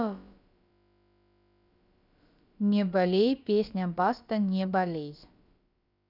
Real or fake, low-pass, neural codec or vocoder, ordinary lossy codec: fake; 5.4 kHz; codec, 16 kHz, about 1 kbps, DyCAST, with the encoder's durations; none